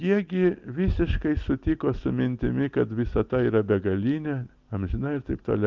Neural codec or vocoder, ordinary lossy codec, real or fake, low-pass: none; Opus, 24 kbps; real; 7.2 kHz